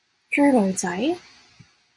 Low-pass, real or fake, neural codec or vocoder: 10.8 kHz; real; none